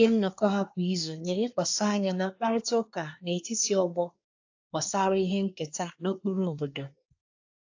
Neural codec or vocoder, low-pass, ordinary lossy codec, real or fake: codec, 24 kHz, 1 kbps, SNAC; 7.2 kHz; AAC, 48 kbps; fake